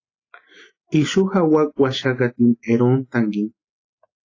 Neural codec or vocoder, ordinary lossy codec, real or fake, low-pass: none; AAC, 32 kbps; real; 7.2 kHz